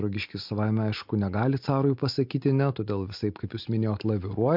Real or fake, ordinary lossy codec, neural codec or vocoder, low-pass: real; MP3, 48 kbps; none; 5.4 kHz